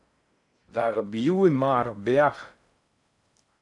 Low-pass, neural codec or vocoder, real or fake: 10.8 kHz; codec, 16 kHz in and 24 kHz out, 0.6 kbps, FocalCodec, streaming, 4096 codes; fake